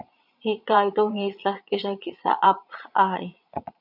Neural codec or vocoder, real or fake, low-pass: vocoder, 22.05 kHz, 80 mel bands, Vocos; fake; 5.4 kHz